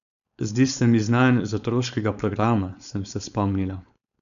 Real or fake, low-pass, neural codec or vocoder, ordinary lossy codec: fake; 7.2 kHz; codec, 16 kHz, 4.8 kbps, FACodec; none